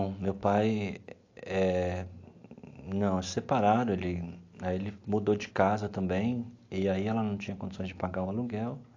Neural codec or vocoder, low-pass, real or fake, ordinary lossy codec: none; 7.2 kHz; real; AAC, 48 kbps